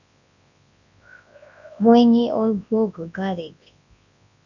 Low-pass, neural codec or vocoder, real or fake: 7.2 kHz; codec, 24 kHz, 0.9 kbps, WavTokenizer, large speech release; fake